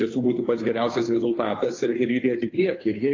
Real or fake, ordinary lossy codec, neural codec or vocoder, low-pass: fake; AAC, 32 kbps; codec, 16 kHz, 2 kbps, FunCodec, trained on Chinese and English, 25 frames a second; 7.2 kHz